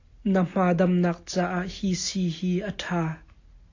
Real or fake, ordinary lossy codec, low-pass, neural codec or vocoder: real; AAC, 48 kbps; 7.2 kHz; none